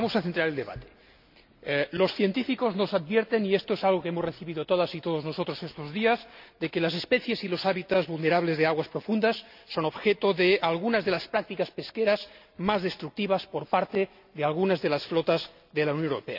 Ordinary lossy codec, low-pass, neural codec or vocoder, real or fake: none; 5.4 kHz; none; real